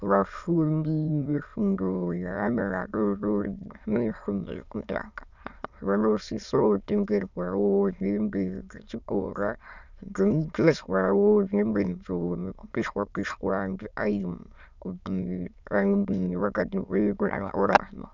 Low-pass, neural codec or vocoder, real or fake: 7.2 kHz; autoencoder, 22.05 kHz, a latent of 192 numbers a frame, VITS, trained on many speakers; fake